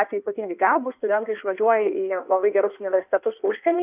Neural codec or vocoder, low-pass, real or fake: codec, 16 kHz in and 24 kHz out, 1.1 kbps, FireRedTTS-2 codec; 3.6 kHz; fake